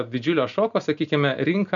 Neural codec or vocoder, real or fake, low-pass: none; real; 7.2 kHz